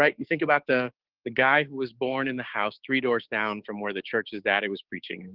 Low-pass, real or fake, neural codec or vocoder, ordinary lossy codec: 5.4 kHz; fake; codec, 16 kHz, 6 kbps, DAC; Opus, 16 kbps